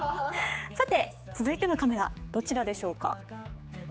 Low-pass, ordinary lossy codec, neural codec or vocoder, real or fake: none; none; codec, 16 kHz, 4 kbps, X-Codec, HuBERT features, trained on general audio; fake